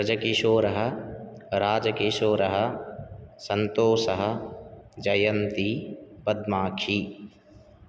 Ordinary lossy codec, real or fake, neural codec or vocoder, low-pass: none; real; none; none